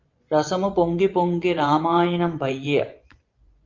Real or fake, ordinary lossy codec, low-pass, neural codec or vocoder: fake; Opus, 32 kbps; 7.2 kHz; vocoder, 24 kHz, 100 mel bands, Vocos